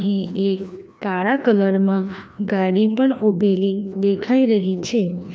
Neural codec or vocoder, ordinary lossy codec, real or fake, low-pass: codec, 16 kHz, 1 kbps, FreqCodec, larger model; none; fake; none